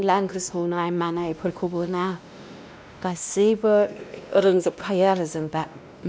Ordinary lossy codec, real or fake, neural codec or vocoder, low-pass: none; fake; codec, 16 kHz, 1 kbps, X-Codec, WavLM features, trained on Multilingual LibriSpeech; none